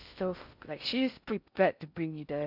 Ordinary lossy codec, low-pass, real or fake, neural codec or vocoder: none; 5.4 kHz; fake; codec, 16 kHz in and 24 kHz out, 0.6 kbps, FocalCodec, streaming, 2048 codes